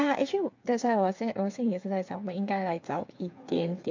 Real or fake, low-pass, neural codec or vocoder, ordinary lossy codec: fake; 7.2 kHz; codec, 16 kHz, 8 kbps, FreqCodec, smaller model; MP3, 48 kbps